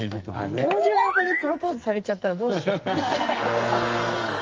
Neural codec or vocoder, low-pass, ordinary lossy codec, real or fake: codec, 44.1 kHz, 2.6 kbps, SNAC; 7.2 kHz; Opus, 24 kbps; fake